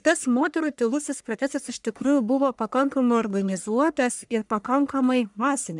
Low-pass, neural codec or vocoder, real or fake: 10.8 kHz; codec, 44.1 kHz, 1.7 kbps, Pupu-Codec; fake